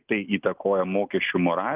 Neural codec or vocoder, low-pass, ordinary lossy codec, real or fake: none; 3.6 kHz; Opus, 32 kbps; real